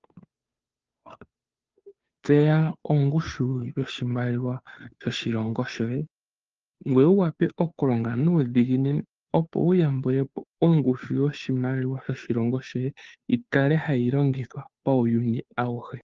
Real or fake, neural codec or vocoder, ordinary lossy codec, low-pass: fake; codec, 16 kHz, 2 kbps, FunCodec, trained on Chinese and English, 25 frames a second; Opus, 24 kbps; 7.2 kHz